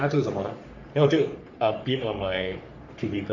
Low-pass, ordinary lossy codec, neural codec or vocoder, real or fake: 7.2 kHz; none; codec, 44.1 kHz, 3.4 kbps, Pupu-Codec; fake